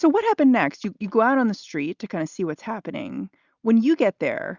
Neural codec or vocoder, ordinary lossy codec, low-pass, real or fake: none; Opus, 64 kbps; 7.2 kHz; real